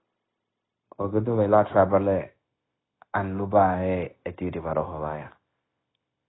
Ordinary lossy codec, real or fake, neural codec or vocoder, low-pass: AAC, 16 kbps; fake; codec, 16 kHz, 0.9 kbps, LongCat-Audio-Codec; 7.2 kHz